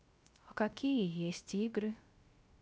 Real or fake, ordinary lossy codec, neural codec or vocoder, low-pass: fake; none; codec, 16 kHz, 0.3 kbps, FocalCodec; none